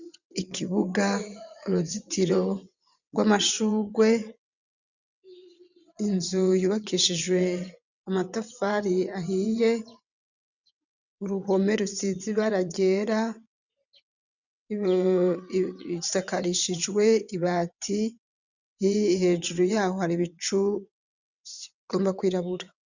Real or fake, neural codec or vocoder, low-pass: fake; vocoder, 22.05 kHz, 80 mel bands, WaveNeXt; 7.2 kHz